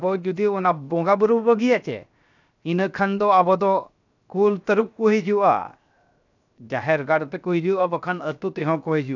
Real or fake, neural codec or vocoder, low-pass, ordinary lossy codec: fake; codec, 16 kHz, 0.7 kbps, FocalCodec; 7.2 kHz; none